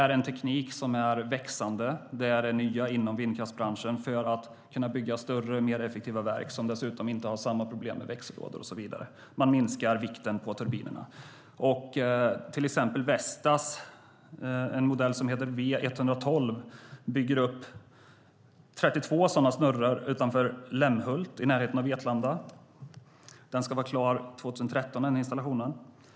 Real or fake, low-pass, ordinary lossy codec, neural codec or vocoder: real; none; none; none